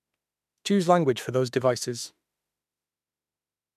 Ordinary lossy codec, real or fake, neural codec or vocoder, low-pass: none; fake; autoencoder, 48 kHz, 32 numbers a frame, DAC-VAE, trained on Japanese speech; 14.4 kHz